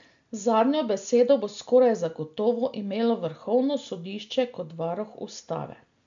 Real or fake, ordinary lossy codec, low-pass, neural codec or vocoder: real; MP3, 64 kbps; 7.2 kHz; none